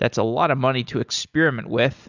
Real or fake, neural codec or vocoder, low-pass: real; none; 7.2 kHz